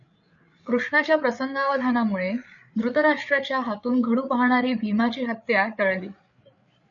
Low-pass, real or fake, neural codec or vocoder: 7.2 kHz; fake; codec, 16 kHz, 8 kbps, FreqCodec, larger model